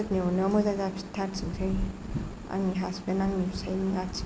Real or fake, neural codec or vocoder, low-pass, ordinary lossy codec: real; none; none; none